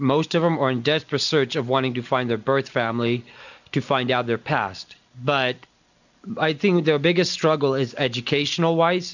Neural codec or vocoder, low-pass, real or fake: none; 7.2 kHz; real